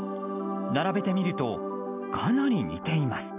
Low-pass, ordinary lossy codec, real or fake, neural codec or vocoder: 3.6 kHz; none; real; none